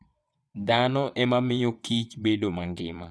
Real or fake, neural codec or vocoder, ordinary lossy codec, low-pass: fake; vocoder, 22.05 kHz, 80 mel bands, WaveNeXt; none; none